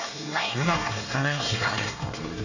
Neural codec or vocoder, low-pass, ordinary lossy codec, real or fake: codec, 24 kHz, 1 kbps, SNAC; 7.2 kHz; none; fake